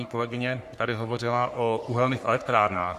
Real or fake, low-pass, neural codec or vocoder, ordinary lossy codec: fake; 14.4 kHz; codec, 44.1 kHz, 3.4 kbps, Pupu-Codec; Opus, 64 kbps